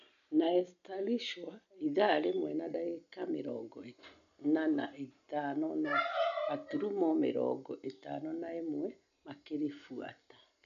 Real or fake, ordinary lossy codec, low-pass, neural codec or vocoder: real; none; 7.2 kHz; none